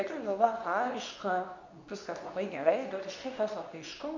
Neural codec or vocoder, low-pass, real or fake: codec, 24 kHz, 0.9 kbps, WavTokenizer, medium speech release version 2; 7.2 kHz; fake